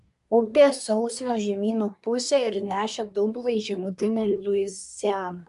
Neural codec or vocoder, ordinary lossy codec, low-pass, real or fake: codec, 24 kHz, 1 kbps, SNAC; AAC, 96 kbps; 10.8 kHz; fake